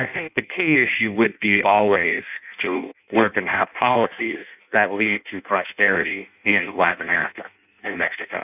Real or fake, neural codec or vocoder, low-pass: fake; codec, 16 kHz in and 24 kHz out, 0.6 kbps, FireRedTTS-2 codec; 3.6 kHz